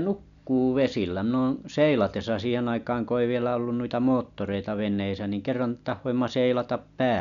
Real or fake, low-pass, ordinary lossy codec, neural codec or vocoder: real; 7.2 kHz; none; none